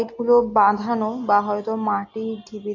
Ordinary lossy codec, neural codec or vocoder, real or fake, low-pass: AAC, 32 kbps; none; real; 7.2 kHz